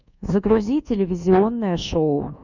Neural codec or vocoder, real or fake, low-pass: codec, 24 kHz, 1.2 kbps, DualCodec; fake; 7.2 kHz